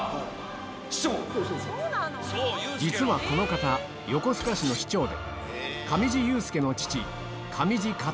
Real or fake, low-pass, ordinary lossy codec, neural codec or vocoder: real; none; none; none